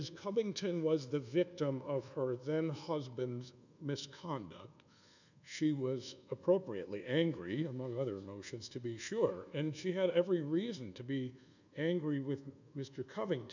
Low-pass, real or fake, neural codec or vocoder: 7.2 kHz; fake; codec, 24 kHz, 1.2 kbps, DualCodec